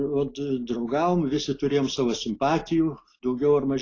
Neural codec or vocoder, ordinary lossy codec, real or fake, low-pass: none; AAC, 32 kbps; real; 7.2 kHz